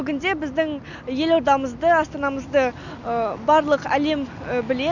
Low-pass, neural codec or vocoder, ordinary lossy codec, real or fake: 7.2 kHz; none; none; real